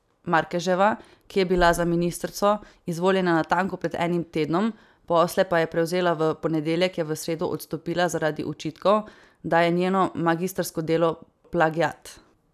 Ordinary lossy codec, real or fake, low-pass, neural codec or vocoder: none; real; 14.4 kHz; none